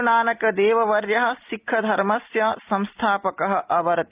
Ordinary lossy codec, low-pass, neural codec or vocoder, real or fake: Opus, 24 kbps; 3.6 kHz; none; real